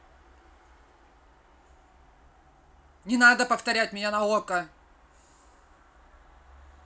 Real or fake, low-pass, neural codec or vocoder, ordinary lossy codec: real; none; none; none